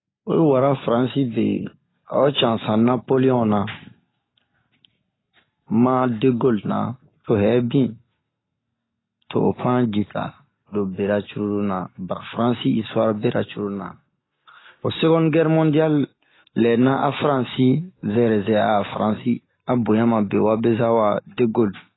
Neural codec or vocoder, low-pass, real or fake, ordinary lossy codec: none; 7.2 kHz; real; AAC, 16 kbps